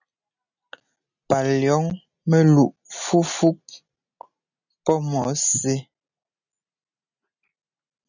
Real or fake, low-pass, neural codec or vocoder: real; 7.2 kHz; none